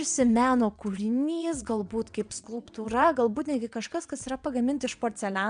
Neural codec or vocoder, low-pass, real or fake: vocoder, 22.05 kHz, 80 mel bands, WaveNeXt; 9.9 kHz; fake